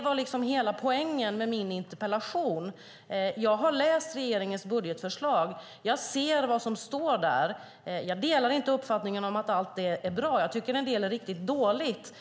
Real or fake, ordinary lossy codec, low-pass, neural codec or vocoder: real; none; none; none